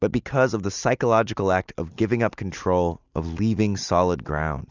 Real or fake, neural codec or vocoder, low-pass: real; none; 7.2 kHz